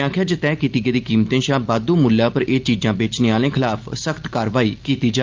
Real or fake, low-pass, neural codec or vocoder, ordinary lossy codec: real; 7.2 kHz; none; Opus, 32 kbps